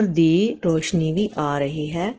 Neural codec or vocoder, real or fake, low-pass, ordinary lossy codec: none; real; 7.2 kHz; Opus, 16 kbps